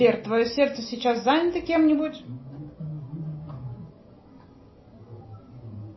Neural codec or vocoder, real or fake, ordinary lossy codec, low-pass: none; real; MP3, 24 kbps; 7.2 kHz